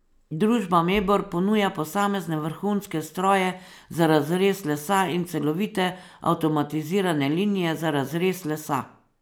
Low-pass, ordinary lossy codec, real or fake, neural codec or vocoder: none; none; real; none